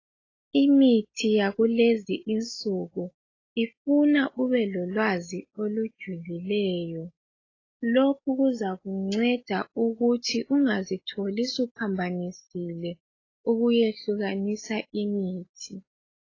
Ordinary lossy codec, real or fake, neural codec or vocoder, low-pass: AAC, 32 kbps; real; none; 7.2 kHz